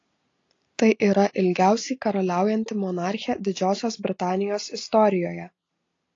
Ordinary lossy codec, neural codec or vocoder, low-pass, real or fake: AAC, 32 kbps; none; 7.2 kHz; real